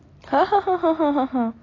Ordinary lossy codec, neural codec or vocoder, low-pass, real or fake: AAC, 32 kbps; none; 7.2 kHz; real